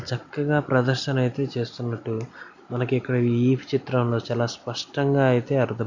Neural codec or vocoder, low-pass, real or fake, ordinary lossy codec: none; 7.2 kHz; real; none